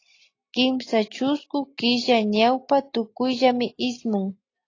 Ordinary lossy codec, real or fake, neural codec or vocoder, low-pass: AAC, 32 kbps; real; none; 7.2 kHz